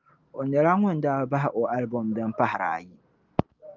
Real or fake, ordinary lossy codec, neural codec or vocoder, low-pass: real; Opus, 24 kbps; none; 7.2 kHz